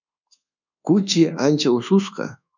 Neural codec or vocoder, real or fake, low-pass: codec, 24 kHz, 1.2 kbps, DualCodec; fake; 7.2 kHz